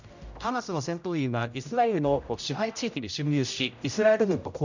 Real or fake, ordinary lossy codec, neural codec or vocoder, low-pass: fake; none; codec, 16 kHz, 0.5 kbps, X-Codec, HuBERT features, trained on general audio; 7.2 kHz